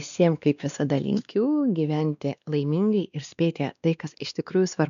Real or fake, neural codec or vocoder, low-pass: fake; codec, 16 kHz, 2 kbps, X-Codec, WavLM features, trained on Multilingual LibriSpeech; 7.2 kHz